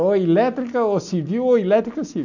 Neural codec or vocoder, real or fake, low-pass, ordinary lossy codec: none; real; 7.2 kHz; none